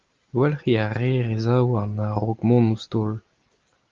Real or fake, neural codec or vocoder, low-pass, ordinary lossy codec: real; none; 7.2 kHz; Opus, 32 kbps